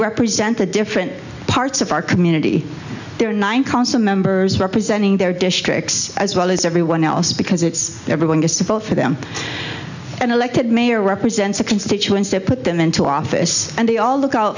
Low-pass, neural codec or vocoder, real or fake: 7.2 kHz; none; real